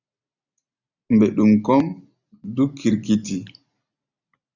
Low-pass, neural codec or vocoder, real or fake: 7.2 kHz; none; real